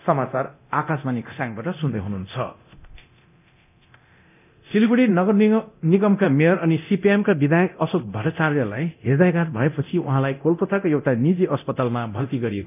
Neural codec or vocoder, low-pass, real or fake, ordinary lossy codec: codec, 24 kHz, 0.9 kbps, DualCodec; 3.6 kHz; fake; none